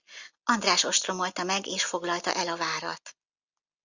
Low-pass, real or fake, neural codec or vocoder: 7.2 kHz; real; none